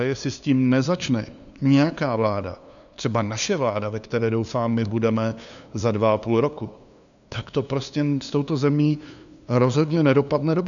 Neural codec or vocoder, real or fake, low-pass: codec, 16 kHz, 2 kbps, FunCodec, trained on LibriTTS, 25 frames a second; fake; 7.2 kHz